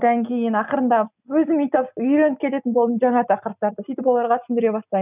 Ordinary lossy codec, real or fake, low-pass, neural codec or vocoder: none; real; 3.6 kHz; none